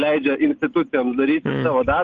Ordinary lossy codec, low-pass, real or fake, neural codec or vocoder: Opus, 24 kbps; 7.2 kHz; real; none